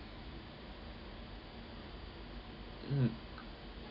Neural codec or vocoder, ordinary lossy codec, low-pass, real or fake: none; none; 5.4 kHz; real